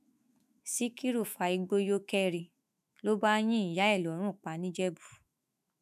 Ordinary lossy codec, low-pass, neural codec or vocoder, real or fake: none; 14.4 kHz; autoencoder, 48 kHz, 128 numbers a frame, DAC-VAE, trained on Japanese speech; fake